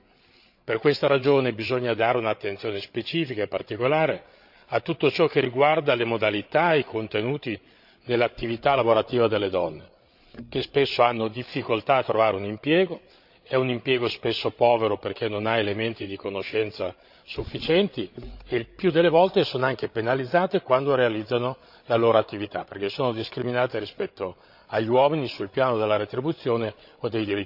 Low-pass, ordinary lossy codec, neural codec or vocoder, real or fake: 5.4 kHz; none; codec, 16 kHz, 8 kbps, FreqCodec, larger model; fake